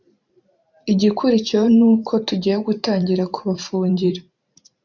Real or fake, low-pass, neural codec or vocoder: fake; 7.2 kHz; vocoder, 44.1 kHz, 128 mel bands every 512 samples, BigVGAN v2